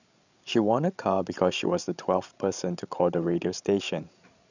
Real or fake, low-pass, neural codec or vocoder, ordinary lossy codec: fake; 7.2 kHz; codec, 16 kHz, 16 kbps, FreqCodec, larger model; none